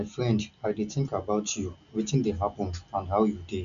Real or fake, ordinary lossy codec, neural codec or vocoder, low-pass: real; none; none; 7.2 kHz